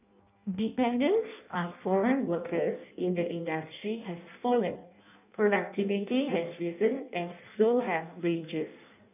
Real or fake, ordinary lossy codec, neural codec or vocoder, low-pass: fake; none; codec, 16 kHz in and 24 kHz out, 0.6 kbps, FireRedTTS-2 codec; 3.6 kHz